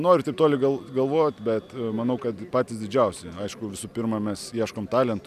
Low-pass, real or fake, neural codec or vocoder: 14.4 kHz; real; none